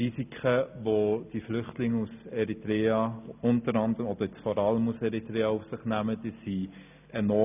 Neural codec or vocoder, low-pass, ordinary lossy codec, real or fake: none; 3.6 kHz; none; real